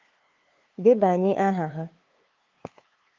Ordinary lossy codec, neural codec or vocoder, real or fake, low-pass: Opus, 24 kbps; codec, 16 kHz, 2 kbps, FunCodec, trained on LibriTTS, 25 frames a second; fake; 7.2 kHz